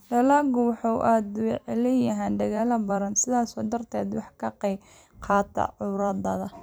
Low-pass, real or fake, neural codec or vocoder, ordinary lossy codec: none; fake; vocoder, 44.1 kHz, 128 mel bands every 256 samples, BigVGAN v2; none